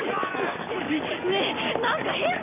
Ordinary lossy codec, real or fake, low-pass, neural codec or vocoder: none; real; 3.6 kHz; none